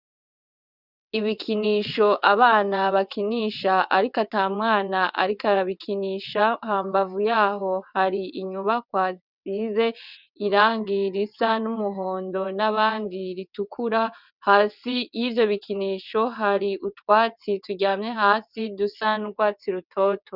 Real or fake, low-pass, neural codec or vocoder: fake; 5.4 kHz; vocoder, 22.05 kHz, 80 mel bands, WaveNeXt